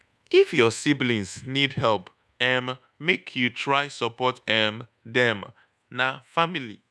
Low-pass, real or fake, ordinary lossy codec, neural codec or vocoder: none; fake; none; codec, 24 kHz, 1.2 kbps, DualCodec